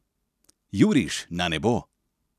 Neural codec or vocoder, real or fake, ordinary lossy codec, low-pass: none; real; none; 14.4 kHz